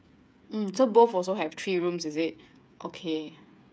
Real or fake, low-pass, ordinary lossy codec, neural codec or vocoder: fake; none; none; codec, 16 kHz, 8 kbps, FreqCodec, smaller model